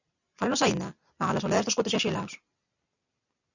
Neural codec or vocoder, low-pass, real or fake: none; 7.2 kHz; real